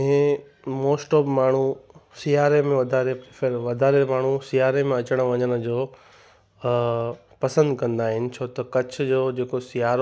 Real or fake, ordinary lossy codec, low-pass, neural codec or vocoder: real; none; none; none